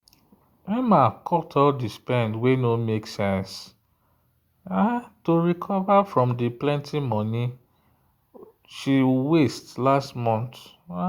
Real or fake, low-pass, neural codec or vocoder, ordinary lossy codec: real; 19.8 kHz; none; Opus, 64 kbps